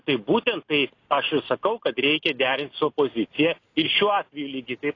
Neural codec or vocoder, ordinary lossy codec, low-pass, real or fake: none; AAC, 32 kbps; 7.2 kHz; real